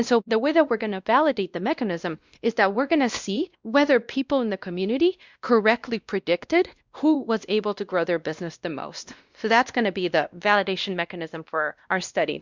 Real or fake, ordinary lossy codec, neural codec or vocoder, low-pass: fake; Opus, 64 kbps; codec, 16 kHz, 1 kbps, X-Codec, WavLM features, trained on Multilingual LibriSpeech; 7.2 kHz